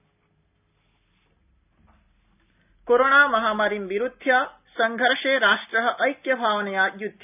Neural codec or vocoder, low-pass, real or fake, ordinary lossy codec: none; 3.6 kHz; real; none